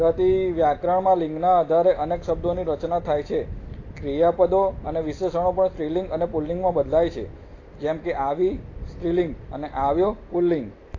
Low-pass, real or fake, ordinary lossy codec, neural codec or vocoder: 7.2 kHz; real; AAC, 32 kbps; none